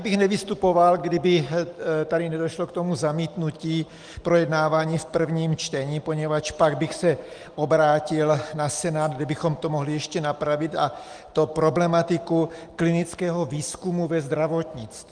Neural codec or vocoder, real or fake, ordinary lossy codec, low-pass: none; real; Opus, 24 kbps; 9.9 kHz